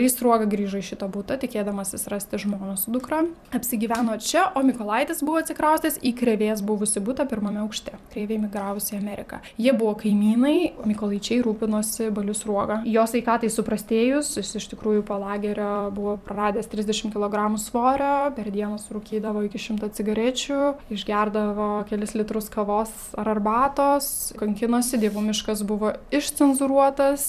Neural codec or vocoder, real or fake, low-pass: vocoder, 44.1 kHz, 128 mel bands every 256 samples, BigVGAN v2; fake; 14.4 kHz